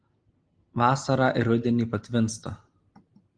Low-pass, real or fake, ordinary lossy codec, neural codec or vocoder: 9.9 kHz; real; Opus, 24 kbps; none